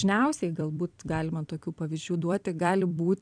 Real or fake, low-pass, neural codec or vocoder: fake; 9.9 kHz; vocoder, 44.1 kHz, 128 mel bands every 256 samples, BigVGAN v2